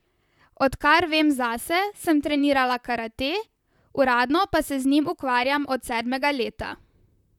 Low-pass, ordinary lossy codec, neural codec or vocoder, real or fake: 19.8 kHz; none; vocoder, 44.1 kHz, 128 mel bands, Pupu-Vocoder; fake